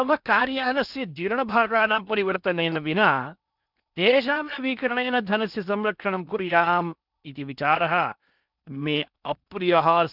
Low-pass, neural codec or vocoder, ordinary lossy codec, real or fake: 5.4 kHz; codec, 16 kHz in and 24 kHz out, 0.8 kbps, FocalCodec, streaming, 65536 codes; none; fake